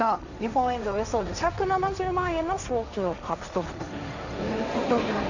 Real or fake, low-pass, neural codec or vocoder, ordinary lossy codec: fake; 7.2 kHz; codec, 16 kHz, 1.1 kbps, Voila-Tokenizer; none